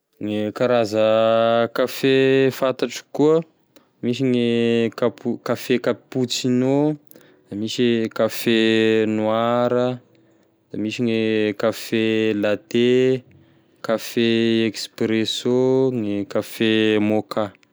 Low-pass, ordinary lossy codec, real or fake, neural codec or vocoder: none; none; real; none